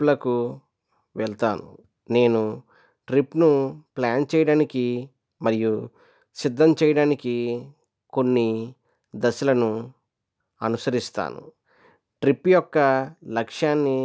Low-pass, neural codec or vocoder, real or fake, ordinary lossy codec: none; none; real; none